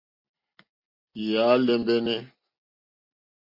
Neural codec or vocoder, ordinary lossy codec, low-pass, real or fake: none; MP3, 32 kbps; 5.4 kHz; real